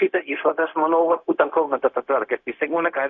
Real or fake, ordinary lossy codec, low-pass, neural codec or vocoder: fake; AAC, 64 kbps; 7.2 kHz; codec, 16 kHz, 0.4 kbps, LongCat-Audio-Codec